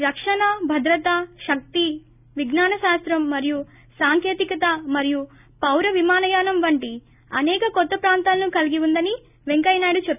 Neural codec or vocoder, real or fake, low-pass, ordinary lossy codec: none; real; 3.6 kHz; none